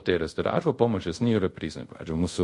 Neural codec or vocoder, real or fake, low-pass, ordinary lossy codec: codec, 24 kHz, 0.5 kbps, DualCodec; fake; 10.8 kHz; MP3, 48 kbps